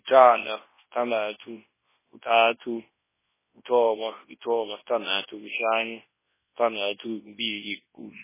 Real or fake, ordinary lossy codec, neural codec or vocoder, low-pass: fake; MP3, 16 kbps; codec, 24 kHz, 0.9 kbps, WavTokenizer, large speech release; 3.6 kHz